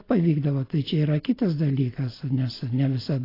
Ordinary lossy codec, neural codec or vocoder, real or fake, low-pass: AAC, 24 kbps; none; real; 5.4 kHz